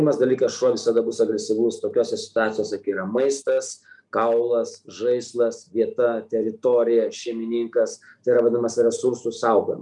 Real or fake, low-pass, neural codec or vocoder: real; 10.8 kHz; none